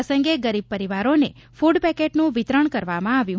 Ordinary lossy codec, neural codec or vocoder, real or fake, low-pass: none; none; real; none